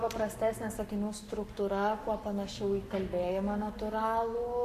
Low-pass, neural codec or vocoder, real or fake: 14.4 kHz; codec, 44.1 kHz, 7.8 kbps, Pupu-Codec; fake